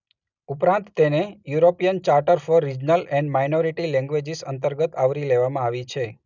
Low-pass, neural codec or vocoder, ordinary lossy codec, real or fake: 7.2 kHz; none; none; real